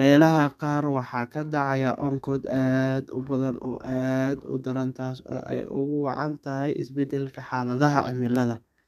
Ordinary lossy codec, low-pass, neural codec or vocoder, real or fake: none; 14.4 kHz; codec, 32 kHz, 1.9 kbps, SNAC; fake